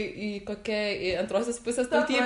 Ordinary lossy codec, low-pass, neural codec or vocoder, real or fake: MP3, 64 kbps; 10.8 kHz; none; real